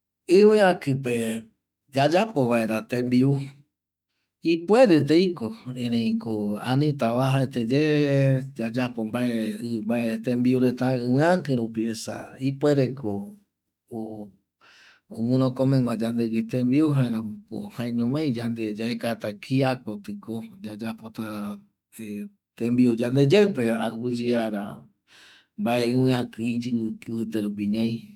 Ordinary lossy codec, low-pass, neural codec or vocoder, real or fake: none; 19.8 kHz; autoencoder, 48 kHz, 32 numbers a frame, DAC-VAE, trained on Japanese speech; fake